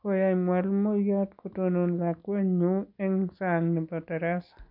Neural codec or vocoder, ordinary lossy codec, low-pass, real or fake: none; none; 5.4 kHz; real